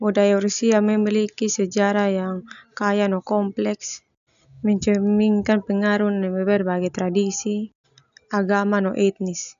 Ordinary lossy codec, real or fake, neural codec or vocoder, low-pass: none; real; none; 7.2 kHz